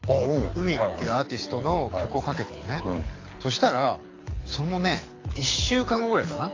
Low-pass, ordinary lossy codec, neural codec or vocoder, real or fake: 7.2 kHz; AAC, 32 kbps; codec, 24 kHz, 6 kbps, HILCodec; fake